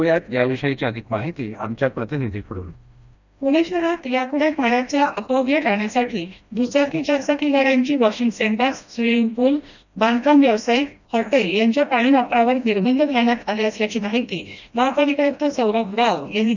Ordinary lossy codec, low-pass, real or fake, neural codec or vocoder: none; 7.2 kHz; fake; codec, 16 kHz, 1 kbps, FreqCodec, smaller model